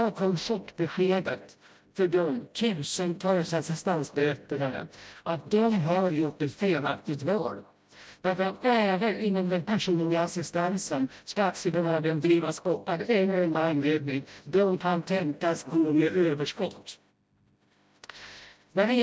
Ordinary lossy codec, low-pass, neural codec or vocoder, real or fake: none; none; codec, 16 kHz, 0.5 kbps, FreqCodec, smaller model; fake